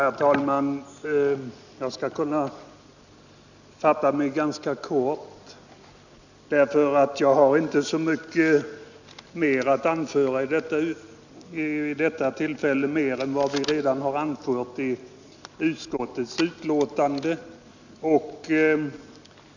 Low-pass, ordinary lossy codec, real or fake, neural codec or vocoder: 7.2 kHz; none; real; none